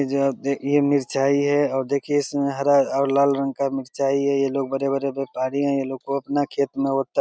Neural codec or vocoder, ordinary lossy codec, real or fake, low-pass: none; none; real; none